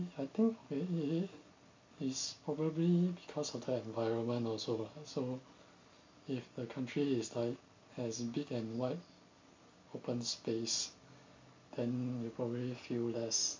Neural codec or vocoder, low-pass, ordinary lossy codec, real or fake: none; 7.2 kHz; MP3, 48 kbps; real